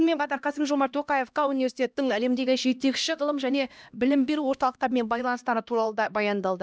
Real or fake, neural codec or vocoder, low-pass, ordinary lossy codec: fake; codec, 16 kHz, 1 kbps, X-Codec, HuBERT features, trained on LibriSpeech; none; none